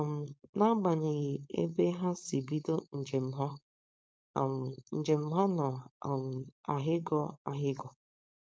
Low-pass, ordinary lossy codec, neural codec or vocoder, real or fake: none; none; codec, 16 kHz, 4.8 kbps, FACodec; fake